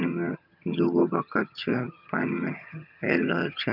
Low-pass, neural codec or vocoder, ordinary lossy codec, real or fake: 5.4 kHz; vocoder, 22.05 kHz, 80 mel bands, HiFi-GAN; none; fake